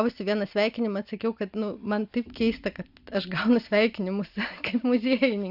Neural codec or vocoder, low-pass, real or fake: none; 5.4 kHz; real